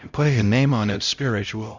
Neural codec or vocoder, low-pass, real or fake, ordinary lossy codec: codec, 16 kHz, 0.5 kbps, X-Codec, HuBERT features, trained on LibriSpeech; 7.2 kHz; fake; Opus, 64 kbps